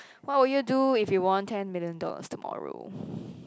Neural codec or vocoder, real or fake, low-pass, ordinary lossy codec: none; real; none; none